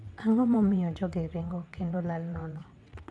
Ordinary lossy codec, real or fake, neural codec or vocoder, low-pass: none; fake; vocoder, 22.05 kHz, 80 mel bands, WaveNeXt; none